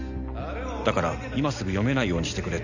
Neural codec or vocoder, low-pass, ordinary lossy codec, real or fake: none; 7.2 kHz; none; real